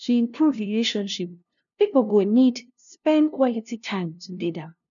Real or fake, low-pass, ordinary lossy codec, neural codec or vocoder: fake; 7.2 kHz; none; codec, 16 kHz, 0.5 kbps, FunCodec, trained on LibriTTS, 25 frames a second